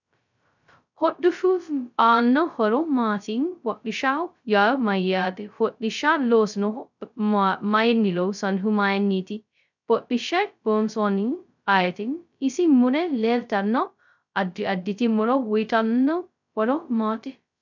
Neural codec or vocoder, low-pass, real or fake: codec, 16 kHz, 0.2 kbps, FocalCodec; 7.2 kHz; fake